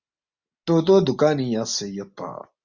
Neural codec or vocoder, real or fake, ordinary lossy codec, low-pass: none; real; Opus, 64 kbps; 7.2 kHz